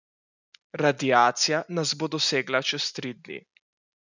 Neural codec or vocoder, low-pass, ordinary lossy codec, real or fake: none; 7.2 kHz; none; real